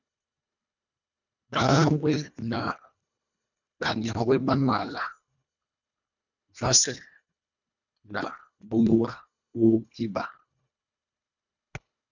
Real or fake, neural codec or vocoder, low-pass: fake; codec, 24 kHz, 1.5 kbps, HILCodec; 7.2 kHz